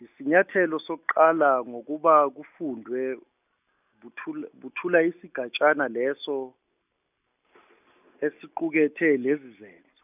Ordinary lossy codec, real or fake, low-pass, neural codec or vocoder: none; real; 3.6 kHz; none